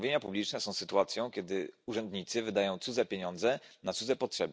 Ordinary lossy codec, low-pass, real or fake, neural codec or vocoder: none; none; real; none